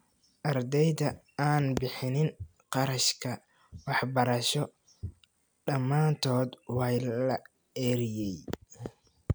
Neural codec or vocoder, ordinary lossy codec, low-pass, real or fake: none; none; none; real